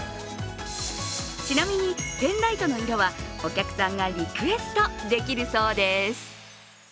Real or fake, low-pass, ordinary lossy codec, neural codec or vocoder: real; none; none; none